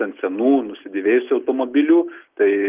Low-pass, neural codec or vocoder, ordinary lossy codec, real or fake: 3.6 kHz; none; Opus, 32 kbps; real